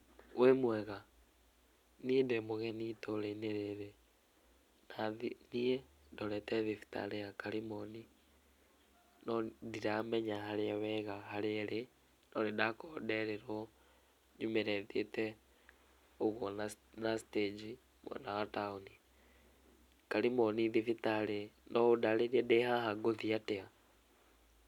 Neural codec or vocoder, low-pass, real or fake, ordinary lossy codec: none; 19.8 kHz; real; none